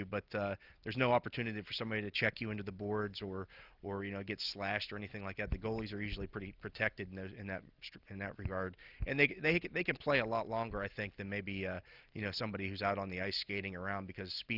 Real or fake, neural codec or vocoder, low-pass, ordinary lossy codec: real; none; 5.4 kHz; Opus, 24 kbps